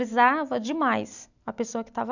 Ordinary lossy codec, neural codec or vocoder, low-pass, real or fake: none; none; 7.2 kHz; real